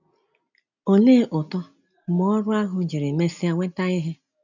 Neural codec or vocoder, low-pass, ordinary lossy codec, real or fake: none; 7.2 kHz; none; real